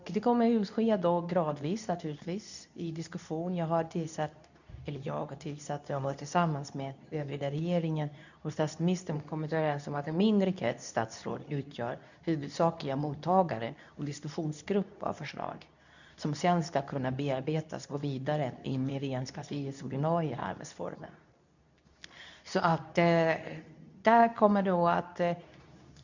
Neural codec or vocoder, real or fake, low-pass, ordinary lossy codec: codec, 24 kHz, 0.9 kbps, WavTokenizer, medium speech release version 2; fake; 7.2 kHz; none